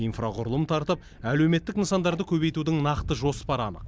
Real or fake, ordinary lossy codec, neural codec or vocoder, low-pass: real; none; none; none